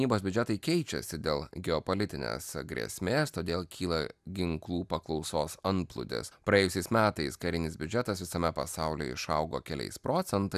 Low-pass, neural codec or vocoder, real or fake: 14.4 kHz; none; real